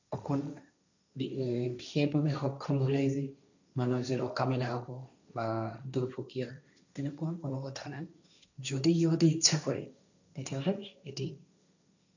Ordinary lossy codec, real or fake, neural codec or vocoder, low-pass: none; fake; codec, 16 kHz, 1.1 kbps, Voila-Tokenizer; 7.2 kHz